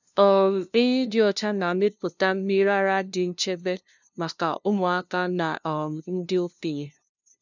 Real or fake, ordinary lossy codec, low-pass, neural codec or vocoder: fake; none; 7.2 kHz; codec, 16 kHz, 0.5 kbps, FunCodec, trained on LibriTTS, 25 frames a second